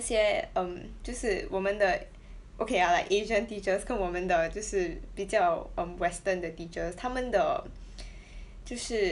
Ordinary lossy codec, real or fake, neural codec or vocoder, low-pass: none; real; none; 10.8 kHz